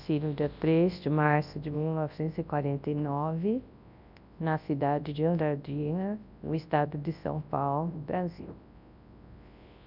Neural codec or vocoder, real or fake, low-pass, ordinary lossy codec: codec, 24 kHz, 0.9 kbps, WavTokenizer, large speech release; fake; 5.4 kHz; none